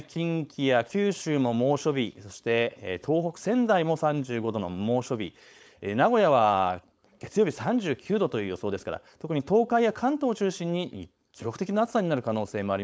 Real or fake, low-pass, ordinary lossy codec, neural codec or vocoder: fake; none; none; codec, 16 kHz, 4.8 kbps, FACodec